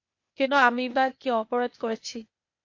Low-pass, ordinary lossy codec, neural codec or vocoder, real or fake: 7.2 kHz; MP3, 48 kbps; codec, 16 kHz, 0.8 kbps, ZipCodec; fake